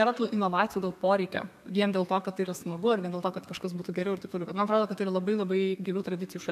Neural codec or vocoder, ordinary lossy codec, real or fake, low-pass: codec, 32 kHz, 1.9 kbps, SNAC; AAC, 96 kbps; fake; 14.4 kHz